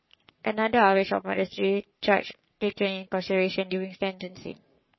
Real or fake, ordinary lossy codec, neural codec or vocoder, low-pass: fake; MP3, 24 kbps; codec, 44.1 kHz, 3.4 kbps, Pupu-Codec; 7.2 kHz